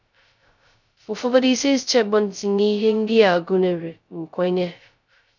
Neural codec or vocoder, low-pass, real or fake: codec, 16 kHz, 0.2 kbps, FocalCodec; 7.2 kHz; fake